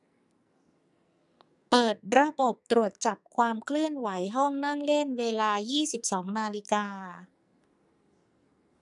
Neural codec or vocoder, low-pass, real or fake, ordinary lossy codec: codec, 44.1 kHz, 2.6 kbps, SNAC; 10.8 kHz; fake; none